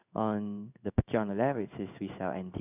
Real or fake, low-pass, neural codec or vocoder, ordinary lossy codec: fake; 3.6 kHz; codec, 16 kHz in and 24 kHz out, 1 kbps, XY-Tokenizer; none